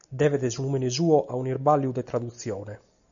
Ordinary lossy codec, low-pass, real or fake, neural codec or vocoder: AAC, 64 kbps; 7.2 kHz; real; none